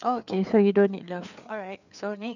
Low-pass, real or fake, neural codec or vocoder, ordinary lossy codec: 7.2 kHz; fake; codec, 16 kHz, 4 kbps, FreqCodec, larger model; none